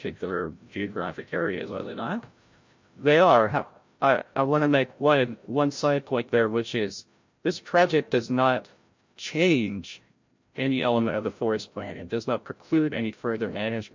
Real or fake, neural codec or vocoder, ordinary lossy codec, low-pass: fake; codec, 16 kHz, 0.5 kbps, FreqCodec, larger model; MP3, 48 kbps; 7.2 kHz